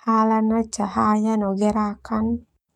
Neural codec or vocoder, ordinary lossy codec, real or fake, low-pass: codec, 44.1 kHz, 7.8 kbps, DAC; MP3, 96 kbps; fake; 19.8 kHz